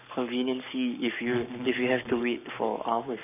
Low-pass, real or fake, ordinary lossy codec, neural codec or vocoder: 3.6 kHz; fake; none; codec, 44.1 kHz, 7.8 kbps, Pupu-Codec